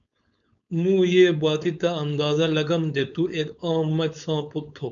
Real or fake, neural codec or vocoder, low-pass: fake; codec, 16 kHz, 4.8 kbps, FACodec; 7.2 kHz